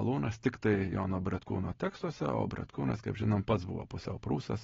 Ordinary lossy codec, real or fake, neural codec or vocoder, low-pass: AAC, 24 kbps; real; none; 7.2 kHz